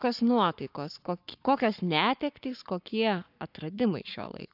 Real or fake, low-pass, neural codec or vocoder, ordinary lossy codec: fake; 5.4 kHz; codec, 44.1 kHz, 7.8 kbps, Pupu-Codec; AAC, 48 kbps